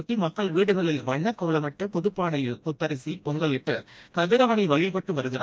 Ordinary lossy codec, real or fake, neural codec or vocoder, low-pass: none; fake; codec, 16 kHz, 1 kbps, FreqCodec, smaller model; none